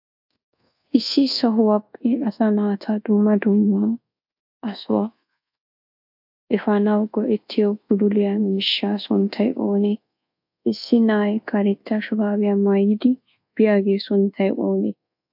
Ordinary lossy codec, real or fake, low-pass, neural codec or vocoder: AAC, 48 kbps; fake; 5.4 kHz; codec, 24 kHz, 1.2 kbps, DualCodec